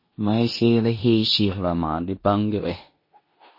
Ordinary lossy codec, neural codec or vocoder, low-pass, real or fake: MP3, 24 kbps; codec, 16 kHz in and 24 kHz out, 0.9 kbps, LongCat-Audio-Codec, four codebook decoder; 5.4 kHz; fake